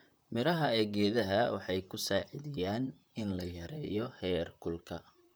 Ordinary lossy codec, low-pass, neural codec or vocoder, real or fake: none; none; vocoder, 44.1 kHz, 128 mel bands, Pupu-Vocoder; fake